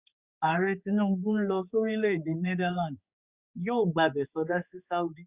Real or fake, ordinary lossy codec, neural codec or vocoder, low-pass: fake; Opus, 64 kbps; codec, 16 kHz, 4 kbps, X-Codec, HuBERT features, trained on general audio; 3.6 kHz